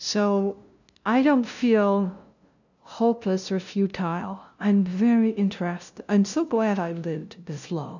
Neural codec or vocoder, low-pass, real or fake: codec, 16 kHz, 0.5 kbps, FunCodec, trained on LibriTTS, 25 frames a second; 7.2 kHz; fake